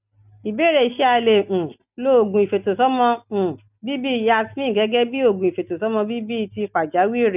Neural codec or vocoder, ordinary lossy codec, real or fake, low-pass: none; none; real; 3.6 kHz